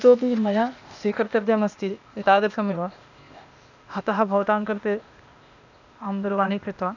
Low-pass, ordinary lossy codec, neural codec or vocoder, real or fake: 7.2 kHz; none; codec, 16 kHz, 0.8 kbps, ZipCodec; fake